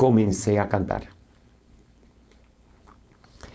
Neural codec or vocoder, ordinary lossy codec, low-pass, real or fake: codec, 16 kHz, 4.8 kbps, FACodec; none; none; fake